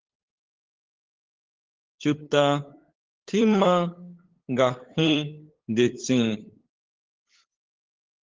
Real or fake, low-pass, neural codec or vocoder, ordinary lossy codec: fake; 7.2 kHz; codec, 16 kHz, 8 kbps, FunCodec, trained on LibriTTS, 25 frames a second; Opus, 16 kbps